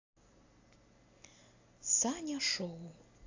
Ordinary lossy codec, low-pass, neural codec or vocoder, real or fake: AAC, 48 kbps; 7.2 kHz; none; real